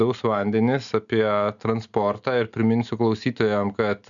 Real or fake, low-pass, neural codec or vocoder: real; 7.2 kHz; none